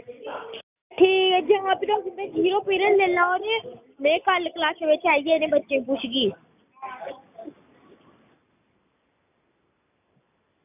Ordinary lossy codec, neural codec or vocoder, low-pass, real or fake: none; none; 3.6 kHz; real